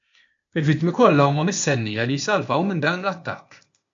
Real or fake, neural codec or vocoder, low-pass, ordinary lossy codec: fake; codec, 16 kHz, 0.8 kbps, ZipCodec; 7.2 kHz; MP3, 48 kbps